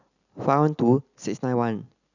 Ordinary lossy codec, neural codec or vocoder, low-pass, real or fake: none; none; 7.2 kHz; real